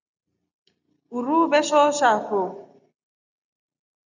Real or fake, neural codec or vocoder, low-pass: real; none; 7.2 kHz